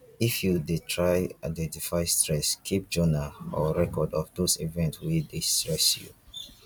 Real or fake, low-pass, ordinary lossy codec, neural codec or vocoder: fake; 19.8 kHz; none; vocoder, 44.1 kHz, 128 mel bands every 256 samples, BigVGAN v2